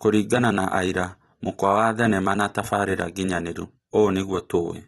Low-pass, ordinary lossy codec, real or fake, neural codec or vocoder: 19.8 kHz; AAC, 32 kbps; fake; vocoder, 44.1 kHz, 128 mel bands every 256 samples, BigVGAN v2